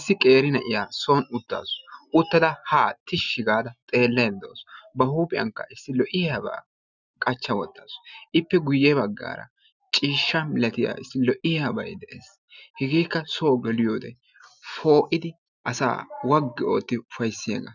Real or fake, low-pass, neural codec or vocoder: real; 7.2 kHz; none